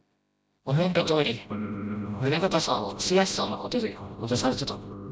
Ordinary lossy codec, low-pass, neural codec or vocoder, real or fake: none; none; codec, 16 kHz, 0.5 kbps, FreqCodec, smaller model; fake